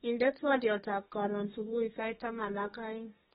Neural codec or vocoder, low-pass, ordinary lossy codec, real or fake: codec, 32 kHz, 1.9 kbps, SNAC; 14.4 kHz; AAC, 16 kbps; fake